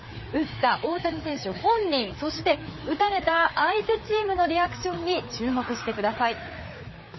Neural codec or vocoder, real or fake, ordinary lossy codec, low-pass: codec, 16 kHz, 4 kbps, FreqCodec, larger model; fake; MP3, 24 kbps; 7.2 kHz